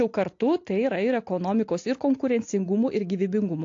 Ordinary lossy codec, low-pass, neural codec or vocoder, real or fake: AAC, 48 kbps; 7.2 kHz; none; real